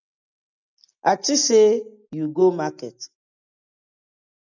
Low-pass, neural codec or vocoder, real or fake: 7.2 kHz; none; real